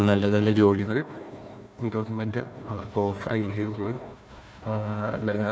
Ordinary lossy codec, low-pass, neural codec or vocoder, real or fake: none; none; codec, 16 kHz, 1 kbps, FunCodec, trained on Chinese and English, 50 frames a second; fake